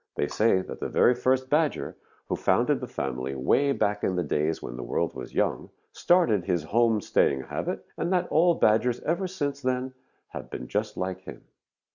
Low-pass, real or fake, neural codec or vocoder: 7.2 kHz; real; none